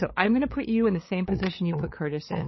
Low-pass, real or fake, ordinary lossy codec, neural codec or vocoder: 7.2 kHz; fake; MP3, 24 kbps; codec, 16 kHz, 8 kbps, FunCodec, trained on LibriTTS, 25 frames a second